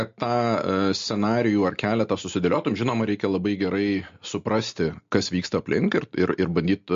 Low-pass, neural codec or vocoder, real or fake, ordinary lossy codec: 7.2 kHz; none; real; MP3, 48 kbps